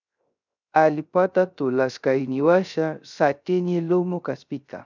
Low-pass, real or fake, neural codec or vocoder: 7.2 kHz; fake; codec, 16 kHz, 0.3 kbps, FocalCodec